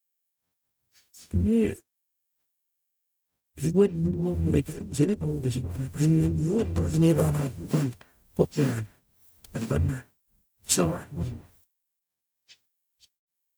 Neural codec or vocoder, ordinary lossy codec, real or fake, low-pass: codec, 44.1 kHz, 0.9 kbps, DAC; none; fake; none